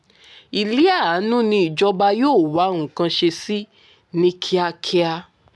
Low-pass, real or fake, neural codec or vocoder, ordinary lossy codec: none; real; none; none